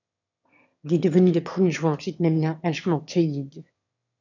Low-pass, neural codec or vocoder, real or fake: 7.2 kHz; autoencoder, 22.05 kHz, a latent of 192 numbers a frame, VITS, trained on one speaker; fake